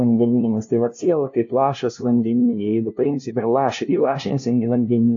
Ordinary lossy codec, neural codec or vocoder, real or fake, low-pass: AAC, 48 kbps; codec, 16 kHz, 0.5 kbps, FunCodec, trained on LibriTTS, 25 frames a second; fake; 7.2 kHz